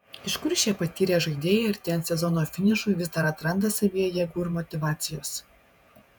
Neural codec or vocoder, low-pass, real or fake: vocoder, 48 kHz, 128 mel bands, Vocos; 19.8 kHz; fake